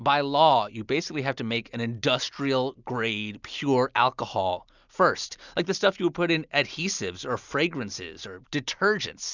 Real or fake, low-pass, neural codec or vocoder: real; 7.2 kHz; none